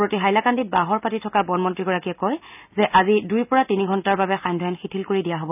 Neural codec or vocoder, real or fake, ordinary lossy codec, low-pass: none; real; none; 3.6 kHz